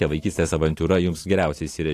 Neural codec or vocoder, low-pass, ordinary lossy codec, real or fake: vocoder, 44.1 kHz, 128 mel bands every 512 samples, BigVGAN v2; 14.4 kHz; AAC, 48 kbps; fake